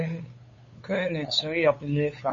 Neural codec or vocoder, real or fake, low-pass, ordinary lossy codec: codec, 16 kHz, 8 kbps, FunCodec, trained on LibriTTS, 25 frames a second; fake; 7.2 kHz; MP3, 32 kbps